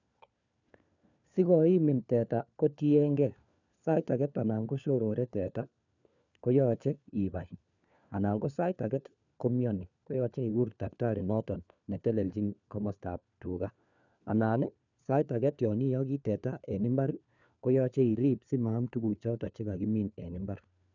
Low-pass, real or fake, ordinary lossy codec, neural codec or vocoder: 7.2 kHz; fake; none; codec, 16 kHz, 4 kbps, FunCodec, trained on LibriTTS, 50 frames a second